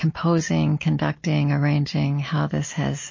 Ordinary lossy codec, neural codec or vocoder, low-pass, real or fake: MP3, 32 kbps; none; 7.2 kHz; real